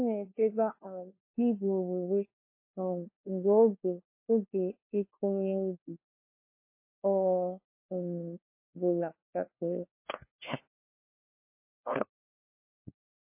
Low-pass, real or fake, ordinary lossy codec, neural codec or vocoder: 3.6 kHz; fake; MP3, 24 kbps; codec, 16 kHz, 1 kbps, FunCodec, trained on LibriTTS, 50 frames a second